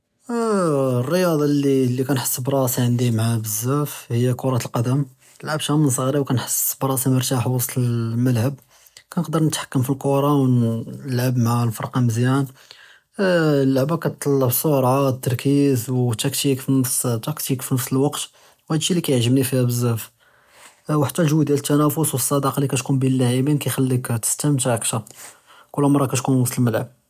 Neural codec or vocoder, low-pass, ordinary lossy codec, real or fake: none; 14.4 kHz; none; real